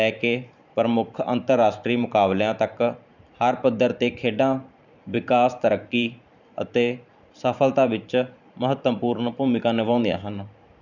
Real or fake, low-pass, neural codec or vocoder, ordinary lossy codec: fake; 7.2 kHz; vocoder, 44.1 kHz, 128 mel bands every 512 samples, BigVGAN v2; none